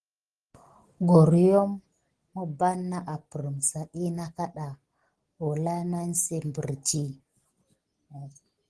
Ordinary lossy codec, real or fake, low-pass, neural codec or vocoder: Opus, 16 kbps; real; 10.8 kHz; none